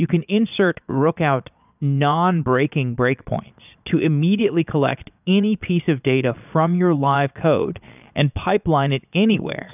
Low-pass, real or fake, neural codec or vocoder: 3.6 kHz; fake; vocoder, 22.05 kHz, 80 mel bands, Vocos